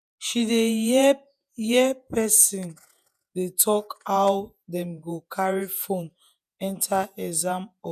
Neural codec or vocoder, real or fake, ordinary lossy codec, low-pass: vocoder, 48 kHz, 128 mel bands, Vocos; fake; none; 14.4 kHz